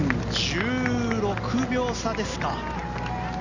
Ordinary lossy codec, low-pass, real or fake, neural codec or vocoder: Opus, 64 kbps; 7.2 kHz; real; none